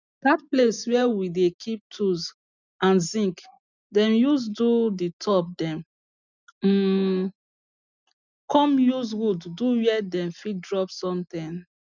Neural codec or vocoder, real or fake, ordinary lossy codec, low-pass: none; real; none; 7.2 kHz